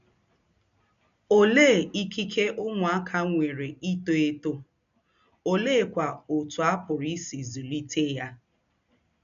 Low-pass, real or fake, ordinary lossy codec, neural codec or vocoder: 7.2 kHz; real; none; none